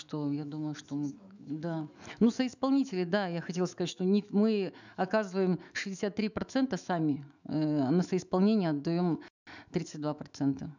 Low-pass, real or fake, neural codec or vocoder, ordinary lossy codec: 7.2 kHz; fake; autoencoder, 48 kHz, 128 numbers a frame, DAC-VAE, trained on Japanese speech; none